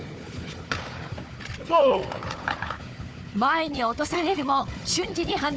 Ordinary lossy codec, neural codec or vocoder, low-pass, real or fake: none; codec, 16 kHz, 4 kbps, FunCodec, trained on Chinese and English, 50 frames a second; none; fake